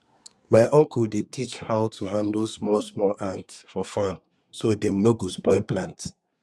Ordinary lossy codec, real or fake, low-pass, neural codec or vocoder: none; fake; none; codec, 24 kHz, 1 kbps, SNAC